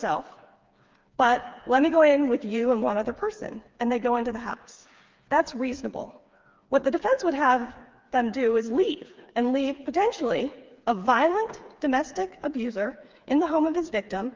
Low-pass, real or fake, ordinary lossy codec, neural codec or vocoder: 7.2 kHz; fake; Opus, 24 kbps; codec, 16 kHz, 4 kbps, FreqCodec, smaller model